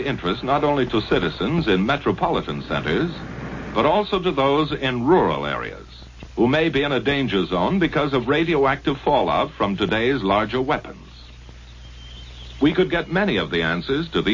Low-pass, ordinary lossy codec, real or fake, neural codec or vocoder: 7.2 kHz; MP3, 32 kbps; real; none